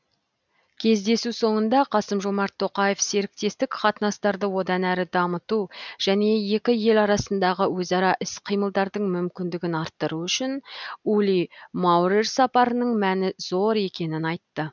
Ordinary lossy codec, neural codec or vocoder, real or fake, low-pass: none; none; real; 7.2 kHz